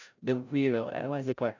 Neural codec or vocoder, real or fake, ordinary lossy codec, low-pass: codec, 16 kHz, 0.5 kbps, FreqCodec, larger model; fake; none; 7.2 kHz